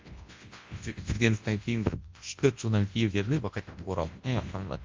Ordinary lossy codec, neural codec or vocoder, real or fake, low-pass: Opus, 32 kbps; codec, 24 kHz, 0.9 kbps, WavTokenizer, large speech release; fake; 7.2 kHz